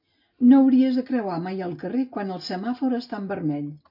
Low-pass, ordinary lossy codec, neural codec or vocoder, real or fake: 5.4 kHz; MP3, 32 kbps; none; real